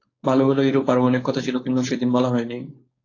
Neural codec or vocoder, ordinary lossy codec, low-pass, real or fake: codec, 16 kHz, 4.8 kbps, FACodec; AAC, 32 kbps; 7.2 kHz; fake